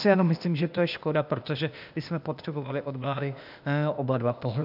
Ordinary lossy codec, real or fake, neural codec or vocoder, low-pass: AAC, 48 kbps; fake; codec, 16 kHz, 0.8 kbps, ZipCodec; 5.4 kHz